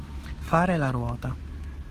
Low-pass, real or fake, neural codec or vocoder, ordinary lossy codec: 14.4 kHz; fake; autoencoder, 48 kHz, 128 numbers a frame, DAC-VAE, trained on Japanese speech; Opus, 16 kbps